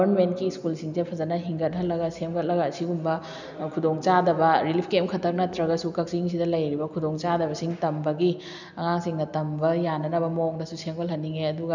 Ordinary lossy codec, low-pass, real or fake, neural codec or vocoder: none; 7.2 kHz; real; none